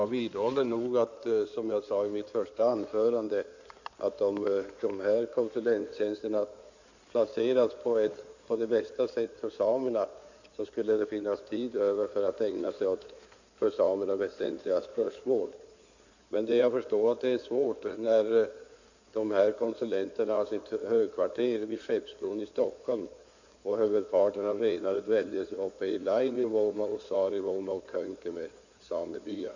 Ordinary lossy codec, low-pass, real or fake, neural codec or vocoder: none; 7.2 kHz; fake; codec, 16 kHz in and 24 kHz out, 2.2 kbps, FireRedTTS-2 codec